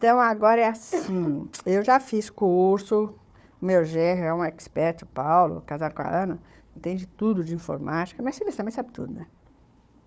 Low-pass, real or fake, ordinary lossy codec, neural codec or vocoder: none; fake; none; codec, 16 kHz, 4 kbps, FunCodec, trained on Chinese and English, 50 frames a second